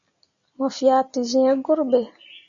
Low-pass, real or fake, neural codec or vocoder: 7.2 kHz; real; none